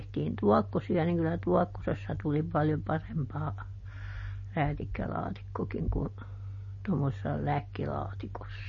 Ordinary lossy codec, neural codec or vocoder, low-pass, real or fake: MP3, 32 kbps; none; 7.2 kHz; real